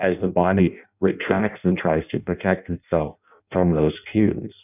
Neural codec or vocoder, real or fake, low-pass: codec, 16 kHz in and 24 kHz out, 0.6 kbps, FireRedTTS-2 codec; fake; 3.6 kHz